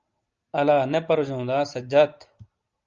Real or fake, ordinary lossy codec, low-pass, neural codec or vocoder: real; Opus, 32 kbps; 7.2 kHz; none